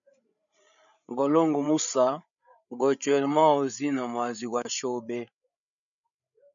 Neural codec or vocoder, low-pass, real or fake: codec, 16 kHz, 8 kbps, FreqCodec, larger model; 7.2 kHz; fake